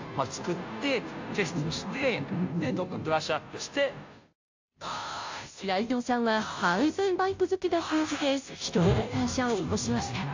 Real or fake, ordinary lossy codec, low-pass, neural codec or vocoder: fake; AAC, 48 kbps; 7.2 kHz; codec, 16 kHz, 0.5 kbps, FunCodec, trained on Chinese and English, 25 frames a second